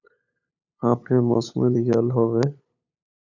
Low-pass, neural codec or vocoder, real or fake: 7.2 kHz; codec, 16 kHz, 8 kbps, FunCodec, trained on LibriTTS, 25 frames a second; fake